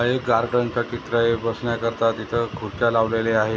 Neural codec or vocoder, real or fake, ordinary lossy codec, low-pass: none; real; none; none